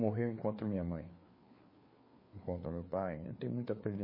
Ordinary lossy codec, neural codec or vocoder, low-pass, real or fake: MP3, 24 kbps; codec, 16 kHz, 4 kbps, FreqCodec, larger model; 5.4 kHz; fake